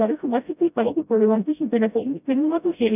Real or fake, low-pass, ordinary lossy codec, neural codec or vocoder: fake; 3.6 kHz; none; codec, 16 kHz, 0.5 kbps, FreqCodec, smaller model